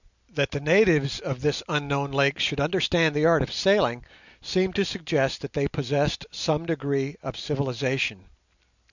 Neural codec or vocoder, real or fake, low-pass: none; real; 7.2 kHz